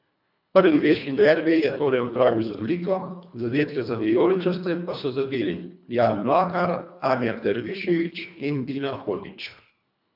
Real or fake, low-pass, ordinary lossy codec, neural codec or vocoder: fake; 5.4 kHz; none; codec, 24 kHz, 1.5 kbps, HILCodec